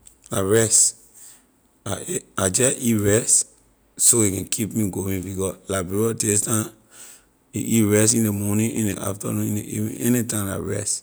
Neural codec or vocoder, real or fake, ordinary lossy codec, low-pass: none; real; none; none